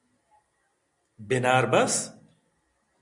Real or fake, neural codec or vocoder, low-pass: real; none; 10.8 kHz